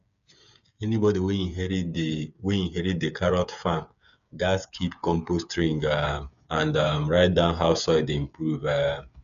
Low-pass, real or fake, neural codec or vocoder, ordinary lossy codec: 7.2 kHz; fake; codec, 16 kHz, 8 kbps, FreqCodec, smaller model; none